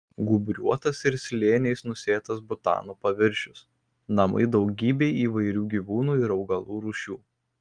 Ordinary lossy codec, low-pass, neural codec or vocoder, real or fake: Opus, 32 kbps; 9.9 kHz; none; real